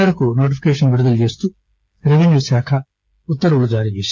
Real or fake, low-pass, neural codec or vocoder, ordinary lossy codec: fake; none; codec, 16 kHz, 8 kbps, FreqCodec, smaller model; none